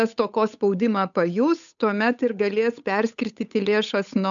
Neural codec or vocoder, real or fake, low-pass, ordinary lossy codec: codec, 16 kHz, 8 kbps, FunCodec, trained on Chinese and English, 25 frames a second; fake; 7.2 kHz; MP3, 96 kbps